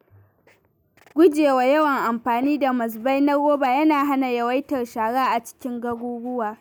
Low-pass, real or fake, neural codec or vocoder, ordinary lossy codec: none; real; none; none